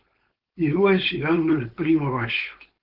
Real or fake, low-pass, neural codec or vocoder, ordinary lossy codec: fake; 5.4 kHz; codec, 16 kHz, 4.8 kbps, FACodec; Opus, 16 kbps